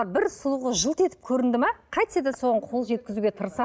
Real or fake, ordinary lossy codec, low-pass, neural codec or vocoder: real; none; none; none